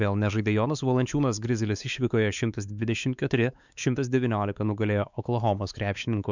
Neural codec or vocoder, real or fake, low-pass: codec, 16 kHz, 4 kbps, X-Codec, WavLM features, trained on Multilingual LibriSpeech; fake; 7.2 kHz